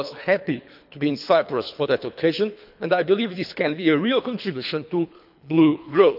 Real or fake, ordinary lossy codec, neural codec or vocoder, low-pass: fake; none; codec, 24 kHz, 3 kbps, HILCodec; 5.4 kHz